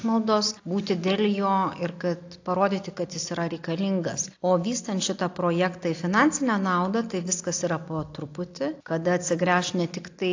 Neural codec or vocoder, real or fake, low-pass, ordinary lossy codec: none; real; 7.2 kHz; AAC, 48 kbps